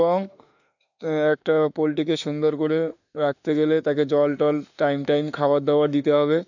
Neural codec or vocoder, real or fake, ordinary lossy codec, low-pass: autoencoder, 48 kHz, 32 numbers a frame, DAC-VAE, trained on Japanese speech; fake; none; 7.2 kHz